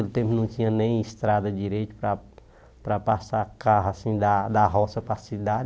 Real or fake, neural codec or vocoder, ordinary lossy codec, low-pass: real; none; none; none